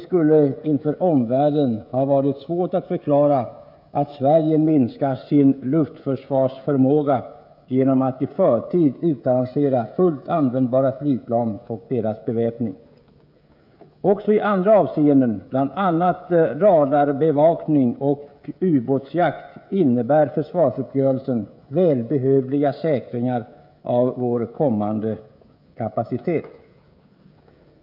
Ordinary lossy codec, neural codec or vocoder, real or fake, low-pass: none; codec, 16 kHz, 16 kbps, FreqCodec, smaller model; fake; 5.4 kHz